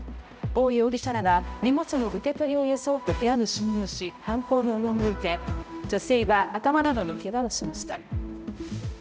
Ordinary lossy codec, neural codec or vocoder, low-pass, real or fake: none; codec, 16 kHz, 0.5 kbps, X-Codec, HuBERT features, trained on balanced general audio; none; fake